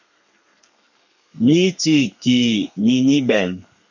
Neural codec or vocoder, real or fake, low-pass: codec, 32 kHz, 1.9 kbps, SNAC; fake; 7.2 kHz